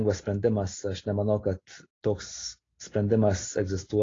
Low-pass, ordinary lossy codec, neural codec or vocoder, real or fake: 7.2 kHz; AAC, 32 kbps; none; real